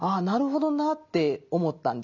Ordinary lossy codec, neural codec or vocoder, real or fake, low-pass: none; none; real; 7.2 kHz